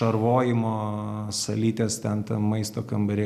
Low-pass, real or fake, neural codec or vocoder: 14.4 kHz; real; none